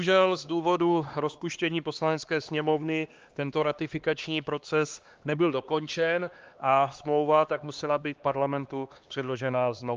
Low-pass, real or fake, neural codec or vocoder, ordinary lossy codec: 7.2 kHz; fake; codec, 16 kHz, 2 kbps, X-Codec, HuBERT features, trained on LibriSpeech; Opus, 24 kbps